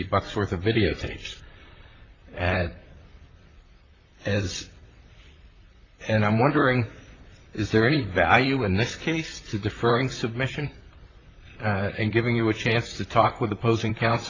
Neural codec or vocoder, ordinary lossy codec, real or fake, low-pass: vocoder, 44.1 kHz, 128 mel bands, Pupu-Vocoder; AAC, 32 kbps; fake; 7.2 kHz